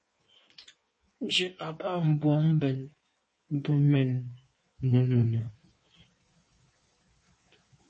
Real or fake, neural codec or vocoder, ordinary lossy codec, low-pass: fake; codec, 16 kHz in and 24 kHz out, 1.1 kbps, FireRedTTS-2 codec; MP3, 32 kbps; 9.9 kHz